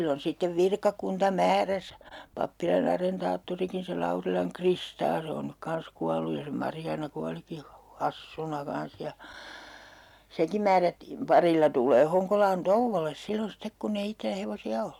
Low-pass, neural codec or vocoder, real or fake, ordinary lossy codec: 19.8 kHz; none; real; none